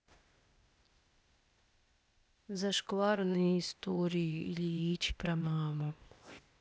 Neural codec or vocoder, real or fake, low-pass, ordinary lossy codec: codec, 16 kHz, 0.8 kbps, ZipCodec; fake; none; none